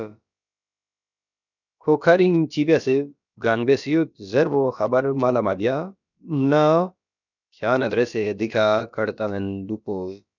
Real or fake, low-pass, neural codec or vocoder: fake; 7.2 kHz; codec, 16 kHz, about 1 kbps, DyCAST, with the encoder's durations